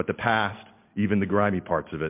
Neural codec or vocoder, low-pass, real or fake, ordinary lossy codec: none; 3.6 kHz; real; MP3, 32 kbps